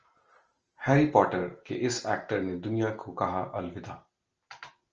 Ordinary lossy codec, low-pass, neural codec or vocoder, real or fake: Opus, 24 kbps; 7.2 kHz; none; real